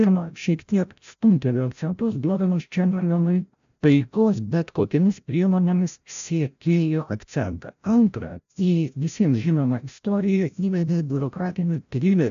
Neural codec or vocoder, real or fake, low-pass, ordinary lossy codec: codec, 16 kHz, 0.5 kbps, FreqCodec, larger model; fake; 7.2 kHz; AAC, 96 kbps